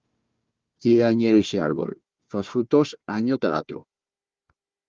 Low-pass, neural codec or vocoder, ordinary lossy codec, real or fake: 7.2 kHz; codec, 16 kHz, 1 kbps, FunCodec, trained on Chinese and English, 50 frames a second; Opus, 32 kbps; fake